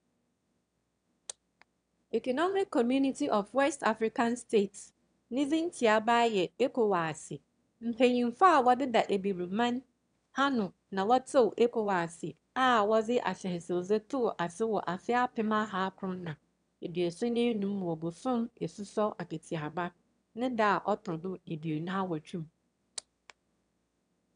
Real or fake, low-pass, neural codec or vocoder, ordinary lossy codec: fake; 9.9 kHz; autoencoder, 22.05 kHz, a latent of 192 numbers a frame, VITS, trained on one speaker; none